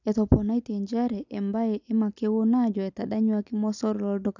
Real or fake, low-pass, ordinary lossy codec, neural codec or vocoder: real; 7.2 kHz; none; none